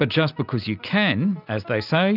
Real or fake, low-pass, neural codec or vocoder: real; 5.4 kHz; none